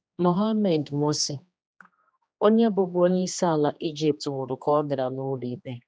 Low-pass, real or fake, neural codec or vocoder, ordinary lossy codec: none; fake; codec, 16 kHz, 2 kbps, X-Codec, HuBERT features, trained on general audio; none